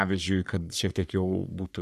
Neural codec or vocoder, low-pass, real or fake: codec, 44.1 kHz, 3.4 kbps, Pupu-Codec; 14.4 kHz; fake